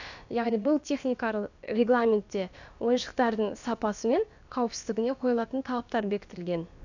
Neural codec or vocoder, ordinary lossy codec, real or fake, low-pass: codec, 16 kHz, about 1 kbps, DyCAST, with the encoder's durations; none; fake; 7.2 kHz